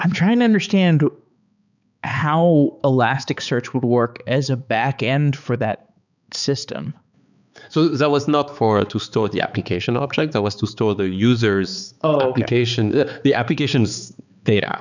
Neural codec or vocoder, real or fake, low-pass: codec, 16 kHz, 4 kbps, X-Codec, HuBERT features, trained on balanced general audio; fake; 7.2 kHz